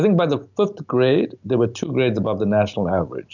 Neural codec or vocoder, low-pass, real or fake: none; 7.2 kHz; real